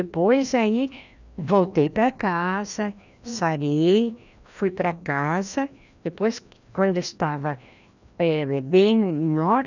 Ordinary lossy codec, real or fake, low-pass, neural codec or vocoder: none; fake; 7.2 kHz; codec, 16 kHz, 1 kbps, FreqCodec, larger model